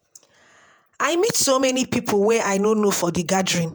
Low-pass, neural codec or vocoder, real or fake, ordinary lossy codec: none; vocoder, 48 kHz, 128 mel bands, Vocos; fake; none